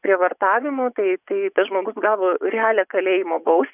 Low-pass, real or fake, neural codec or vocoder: 3.6 kHz; fake; vocoder, 24 kHz, 100 mel bands, Vocos